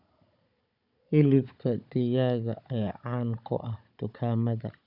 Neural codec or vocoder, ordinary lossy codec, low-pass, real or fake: codec, 16 kHz, 16 kbps, FunCodec, trained on Chinese and English, 50 frames a second; none; 5.4 kHz; fake